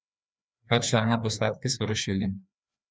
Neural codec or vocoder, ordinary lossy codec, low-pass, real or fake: codec, 16 kHz, 2 kbps, FreqCodec, larger model; none; none; fake